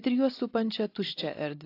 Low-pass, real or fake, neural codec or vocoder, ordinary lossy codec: 5.4 kHz; real; none; AAC, 32 kbps